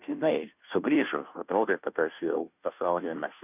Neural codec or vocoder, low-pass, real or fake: codec, 16 kHz, 0.5 kbps, FunCodec, trained on Chinese and English, 25 frames a second; 3.6 kHz; fake